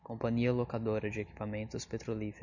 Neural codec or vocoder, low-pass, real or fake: none; 7.2 kHz; real